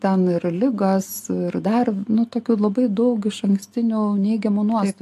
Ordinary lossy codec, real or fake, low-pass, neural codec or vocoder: AAC, 64 kbps; real; 14.4 kHz; none